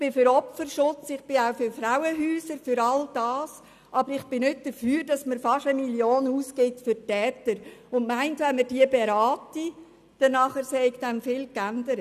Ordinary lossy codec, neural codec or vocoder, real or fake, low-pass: none; none; real; 14.4 kHz